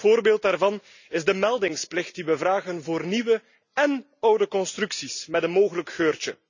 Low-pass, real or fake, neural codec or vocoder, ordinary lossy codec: 7.2 kHz; real; none; none